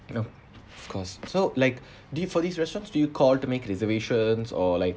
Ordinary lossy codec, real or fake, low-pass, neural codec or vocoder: none; real; none; none